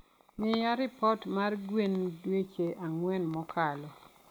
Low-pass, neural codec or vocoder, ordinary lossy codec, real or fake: none; none; none; real